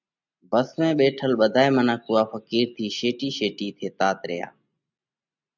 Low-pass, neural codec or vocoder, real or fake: 7.2 kHz; none; real